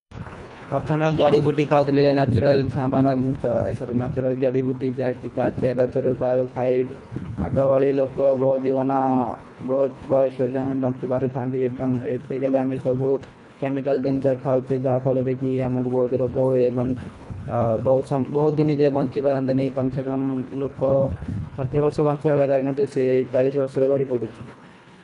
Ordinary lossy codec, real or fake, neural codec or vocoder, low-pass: none; fake; codec, 24 kHz, 1.5 kbps, HILCodec; 10.8 kHz